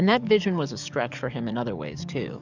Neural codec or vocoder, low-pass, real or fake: codec, 44.1 kHz, 7.8 kbps, DAC; 7.2 kHz; fake